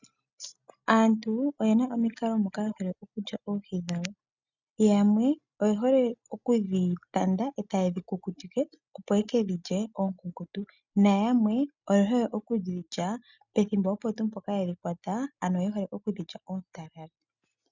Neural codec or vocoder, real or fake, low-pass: none; real; 7.2 kHz